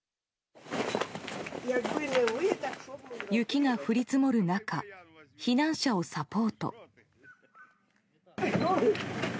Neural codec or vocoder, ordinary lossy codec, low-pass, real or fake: none; none; none; real